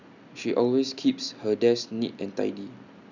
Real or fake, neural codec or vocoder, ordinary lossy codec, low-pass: real; none; none; 7.2 kHz